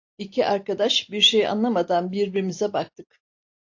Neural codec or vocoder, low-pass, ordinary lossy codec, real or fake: none; 7.2 kHz; AAC, 48 kbps; real